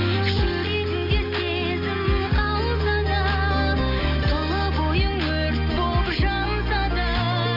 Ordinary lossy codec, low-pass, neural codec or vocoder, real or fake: none; 5.4 kHz; none; real